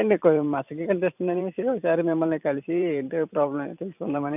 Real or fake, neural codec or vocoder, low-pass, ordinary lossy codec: fake; vocoder, 44.1 kHz, 128 mel bands every 512 samples, BigVGAN v2; 3.6 kHz; none